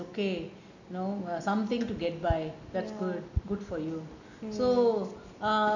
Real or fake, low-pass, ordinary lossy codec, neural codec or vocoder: real; 7.2 kHz; none; none